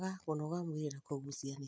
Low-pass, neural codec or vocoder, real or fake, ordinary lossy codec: none; none; real; none